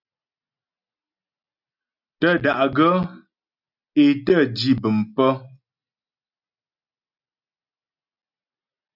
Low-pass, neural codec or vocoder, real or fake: 5.4 kHz; none; real